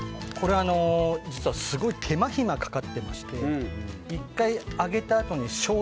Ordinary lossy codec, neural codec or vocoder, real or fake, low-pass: none; none; real; none